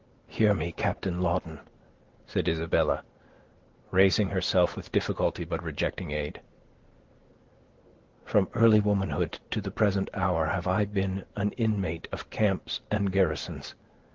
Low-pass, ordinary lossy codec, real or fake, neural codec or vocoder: 7.2 kHz; Opus, 16 kbps; real; none